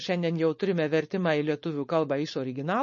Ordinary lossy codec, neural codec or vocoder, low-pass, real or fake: MP3, 32 kbps; codec, 16 kHz, 4.8 kbps, FACodec; 7.2 kHz; fake